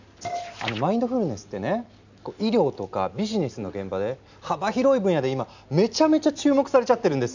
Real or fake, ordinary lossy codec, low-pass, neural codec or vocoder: real; none; 7.2 kHz; none